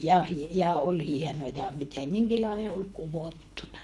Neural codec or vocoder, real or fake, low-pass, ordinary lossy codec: codec, 24 kHz, 3 kbps, HILCodec; fake; none; none